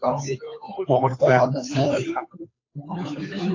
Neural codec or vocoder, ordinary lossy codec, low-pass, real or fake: codec, 16 kHz, 2 kbps, FunCodec, trained on Chinese and English, 25 frames a second; AAC, 32 kbps; 7.2 kHz; fake